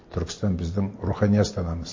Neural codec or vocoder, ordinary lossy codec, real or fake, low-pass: none; MP3, 32 kbps; real; 7.2 kHz